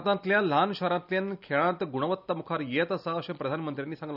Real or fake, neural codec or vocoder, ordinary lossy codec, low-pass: real; none; none; 5.4 kHz